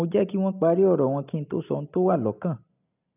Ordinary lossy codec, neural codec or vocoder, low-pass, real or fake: none; none; 3.6 kHz; real